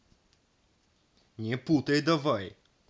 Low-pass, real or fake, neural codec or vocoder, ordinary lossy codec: none; real; none; none